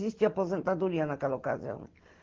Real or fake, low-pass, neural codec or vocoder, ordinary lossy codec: real; 7.2 kHz; none; Opus, 16 kbps